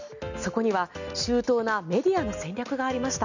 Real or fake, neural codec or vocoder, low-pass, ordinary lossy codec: real; none; 7.2 kHz; none